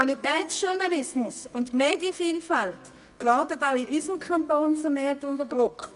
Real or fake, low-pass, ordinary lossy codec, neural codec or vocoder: fake; 10.8 kHz; none; codec, 24 kHz, 0.9 kbps, WavTokenizer, medium music audio release